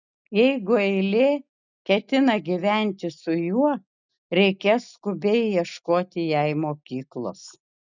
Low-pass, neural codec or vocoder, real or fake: 7.2 kHz; none; real